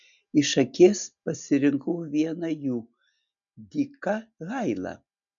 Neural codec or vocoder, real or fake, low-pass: none; real; 7.2 kHz